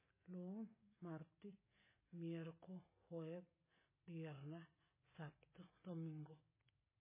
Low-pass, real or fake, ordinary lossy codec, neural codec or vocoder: 3.6 kHz; real; MP3, 16 kbps; none